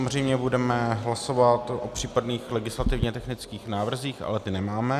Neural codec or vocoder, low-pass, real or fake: none; 14.4 kHz; real